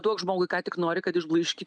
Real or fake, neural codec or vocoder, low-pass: real; none; 9.9 kHz